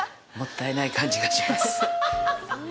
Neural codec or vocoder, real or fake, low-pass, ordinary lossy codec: none; real; none; none